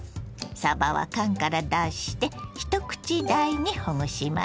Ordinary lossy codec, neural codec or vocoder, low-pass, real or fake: none; none; none; real